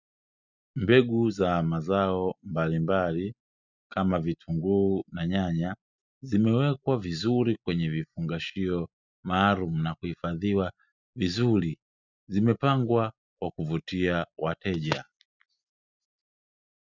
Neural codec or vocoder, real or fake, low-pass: none; real; 7.2 kHz